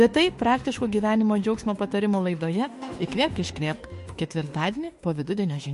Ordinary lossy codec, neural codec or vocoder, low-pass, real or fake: MP3, 48 kbps; autoencoder, 48 kHz, 32 numbers a frame, DAC-VAE, trained on Japanese speech; 14.4 kHz; fake